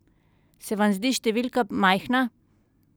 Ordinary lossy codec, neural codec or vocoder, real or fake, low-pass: none; none; real; none